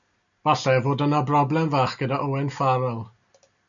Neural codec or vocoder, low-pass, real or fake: none; 7.2 kHz; real